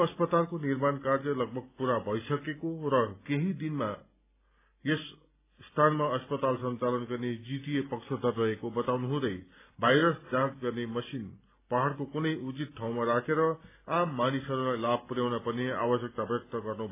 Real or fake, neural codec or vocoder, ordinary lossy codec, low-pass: real; none; AAC, 24 kbps; 3.6 kHz